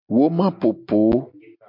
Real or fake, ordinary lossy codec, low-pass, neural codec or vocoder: real; MP3, 48 kbps; 5.4 kHz; none